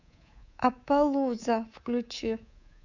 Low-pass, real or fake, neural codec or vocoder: 7.2 kHz; fake; codec, 24 kHz, 3.1 kbps, DualCodec